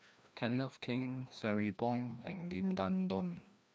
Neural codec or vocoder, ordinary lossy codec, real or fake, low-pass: codec, 16 kHz, 1 kbps, FreqCodec, larger model; none; fake; none